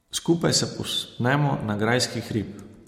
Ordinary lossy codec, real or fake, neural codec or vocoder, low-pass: MP3, 64 kbps; real; none; 19.8 kHz